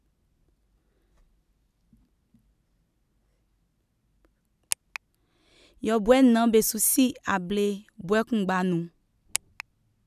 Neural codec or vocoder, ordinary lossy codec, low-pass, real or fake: none; none; 14.4 kHz; real